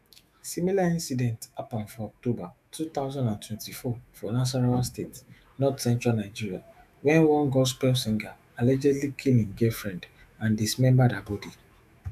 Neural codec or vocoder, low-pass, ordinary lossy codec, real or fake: autoencoder, 48 kHz, 128 numbers a frame, DAC-VAE, trained on Japanese speech; 14.4 kHz; none; fake